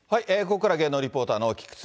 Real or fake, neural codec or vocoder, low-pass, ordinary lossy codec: real; none; none; none